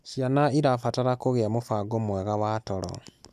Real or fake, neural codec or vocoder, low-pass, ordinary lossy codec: real; none; 14.4 kHz; none